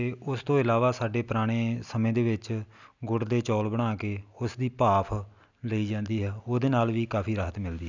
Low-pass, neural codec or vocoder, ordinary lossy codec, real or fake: 7.2 kHz; none; none; real